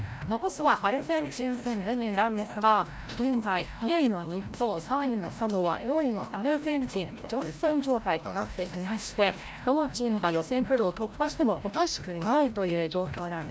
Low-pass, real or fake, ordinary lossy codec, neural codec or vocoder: none; fake; none; codec, 16 kHz, 0.5 kbps, FreqCodec, larger model